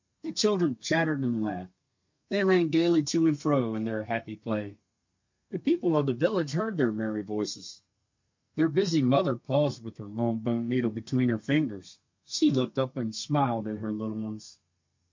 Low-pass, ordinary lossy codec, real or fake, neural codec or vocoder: 7.2 kHz; MP3, 48 kbps; fake; codec, 32 kHz, 1.9 kbps, SNAC